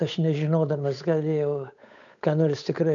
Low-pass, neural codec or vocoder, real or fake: 7.2 kHz; codec, 16 kHz, 8 kbps, FunCodec, trained on Chinese and English, 25 frames a second; fake